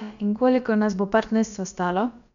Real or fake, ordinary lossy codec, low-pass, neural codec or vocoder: fake; none; 7.2 kHz; codec, 16 kHz, about 1 kbps, DyCAST, with the encoder's durations